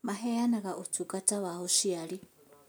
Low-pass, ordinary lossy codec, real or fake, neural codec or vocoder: none; none; real; none